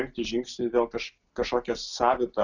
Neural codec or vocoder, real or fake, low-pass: none; real; 7.2 kHz